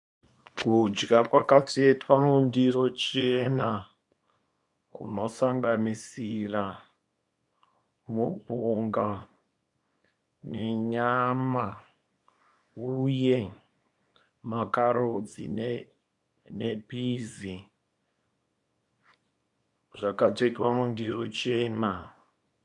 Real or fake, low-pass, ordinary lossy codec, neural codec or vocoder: fake; 10.8 kHz; MP3, 64 kbps; codec, 24 kHz, 0.9 kbps, WavTokenizer, small release